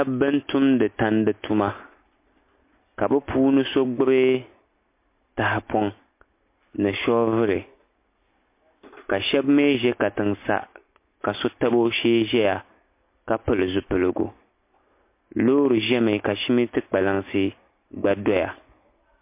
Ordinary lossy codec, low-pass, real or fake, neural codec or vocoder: MP3, 24 kbps; 3.6 kHz; real; none